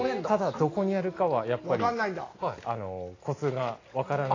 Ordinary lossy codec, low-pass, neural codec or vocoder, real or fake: AAC, 32 kbps; 7.2 kHz; none; real